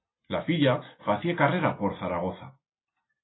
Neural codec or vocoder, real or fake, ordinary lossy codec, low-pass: none; real; AAC, 16 kbps; 7.2 kHz